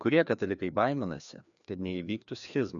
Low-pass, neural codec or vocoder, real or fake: 7.2 kHz; codec, 16 kHz, 2 kbps, FreqCodec, larger model; fake